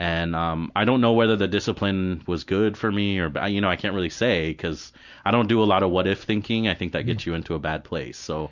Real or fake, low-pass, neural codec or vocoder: real; 7.2 kHz; none